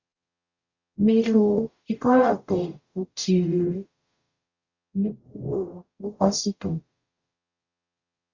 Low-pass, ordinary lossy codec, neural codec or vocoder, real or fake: 7.2 kHz; Opus, 64 kbps; codec, 44.1 kHz, 0.9 kbps, DAC; fake